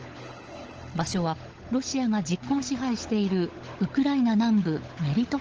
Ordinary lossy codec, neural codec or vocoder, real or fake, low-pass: Opus, 16 kbps; codec, 16 kHz, 16 kbps, FunCodec, trained on LibriTTS, 50 frames a second; fake; 7.2 kHz